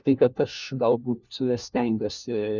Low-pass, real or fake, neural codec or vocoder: 7.2 kHz; fake; codec, 16 kHz, 1 kbps, FunCodec, trained on LibriTTS, 50 frames a second